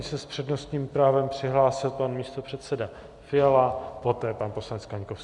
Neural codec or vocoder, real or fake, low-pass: none; real; 10.8 kHz